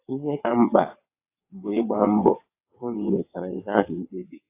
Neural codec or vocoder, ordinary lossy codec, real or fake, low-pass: vocoder, 22.05 kHz, 80 mel bands, WaveNeXt; AAC, 24 kbps; fake; 3.6 kHz